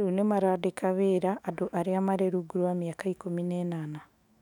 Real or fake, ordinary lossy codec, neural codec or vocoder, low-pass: fake; none; autoencoder, 48 kHz, 128 numbers a frame, DAC-VAE, trained on Japanese speech; 19.8 kHz